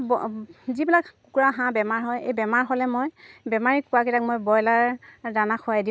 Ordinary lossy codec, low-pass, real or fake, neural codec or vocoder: none; none; real; none